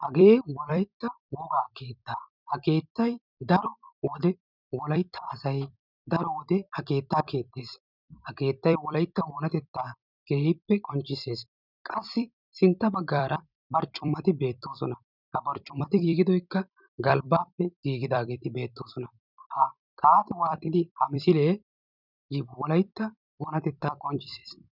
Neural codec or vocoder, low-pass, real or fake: vocoder, 44.1 kHz, 128 mel bands every 256 samples, BigVGAN v2; 5.4 kHz; fake